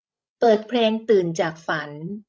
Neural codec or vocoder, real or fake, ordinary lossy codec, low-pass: codec, 16 kHz, 16 kbps, FreqCodec, larger model; fake; none; none